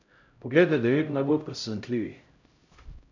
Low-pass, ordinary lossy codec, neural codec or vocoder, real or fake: 7.2 kHz; none; codec, 16 kHz, 0.5 kbps, X-Codec, HuBERT features, trained on LibriSpeech; fake